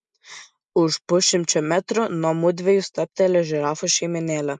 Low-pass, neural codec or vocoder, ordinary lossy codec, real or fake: 10.8 kHz; none; MP3, 96 kbps; real